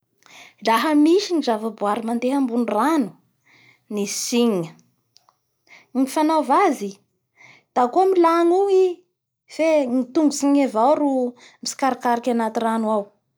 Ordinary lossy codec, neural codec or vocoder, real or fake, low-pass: none; none; real; none